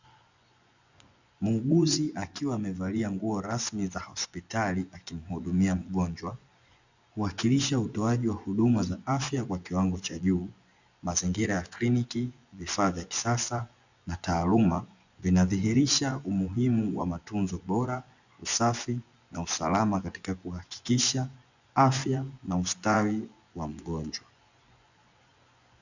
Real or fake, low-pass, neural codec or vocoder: fake; 7.2 kHz; vocoder, 24 kHz, 100 mel bands, Vocos